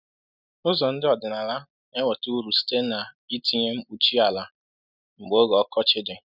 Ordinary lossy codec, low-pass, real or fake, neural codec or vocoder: none; 5.4 kHz; real; none